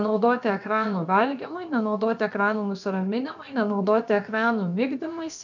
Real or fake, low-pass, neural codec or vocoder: fake; 7.2 kHz; codec, 16 kHz, about 1 kbps, DyCAST, with the encoder's durations